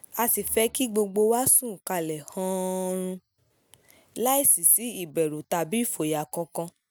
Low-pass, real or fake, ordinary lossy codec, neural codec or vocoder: none; real; none; none